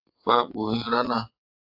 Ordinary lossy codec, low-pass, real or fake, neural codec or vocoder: AAC, 48 kbps; 5.4 kHz; fake; vocoder, 22.05 kHz, 80 mel bands, WaveNeXt